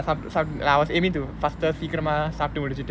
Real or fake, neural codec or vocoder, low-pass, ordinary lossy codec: real; none; none; none